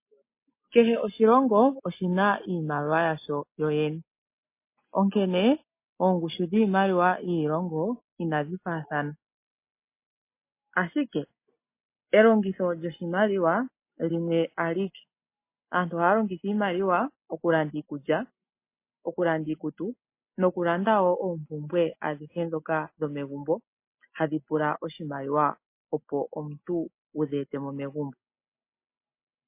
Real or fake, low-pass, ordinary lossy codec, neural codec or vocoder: real; 3.6 kHz; MP3, 24 kbps; none